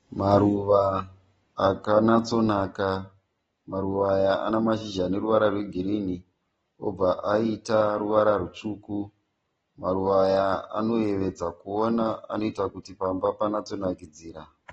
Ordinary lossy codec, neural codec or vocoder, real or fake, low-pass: AAC, 24 kbps; none; real; 19.8 kHz